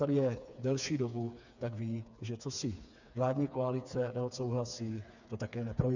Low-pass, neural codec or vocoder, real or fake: 7.2 kHz; codec, 16 kHz, 4 kbps, FreqCodec, smaller model; fake